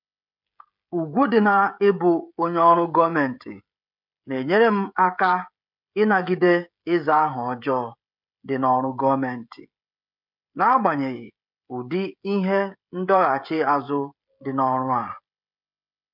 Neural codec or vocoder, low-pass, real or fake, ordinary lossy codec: codec, 16 kHz, 16 kbps, FreqCodec, smaller model; 5.4 kHz; fake; MP3, 48 kbps